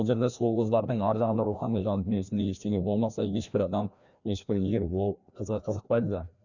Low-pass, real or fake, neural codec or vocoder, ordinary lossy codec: 7.2 kHz; fake; codec, 16 kHz, 1 kbps, FreqCodec, larger model; none